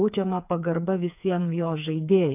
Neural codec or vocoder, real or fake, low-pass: codec, 16 kHz, 4 kbps, FreqCodec, smaller model; fake; 3.6 kHz